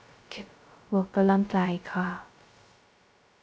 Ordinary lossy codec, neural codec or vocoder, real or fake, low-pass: none; codec, 16 kHz, 0.2 kbps, FocalCodec; fake; none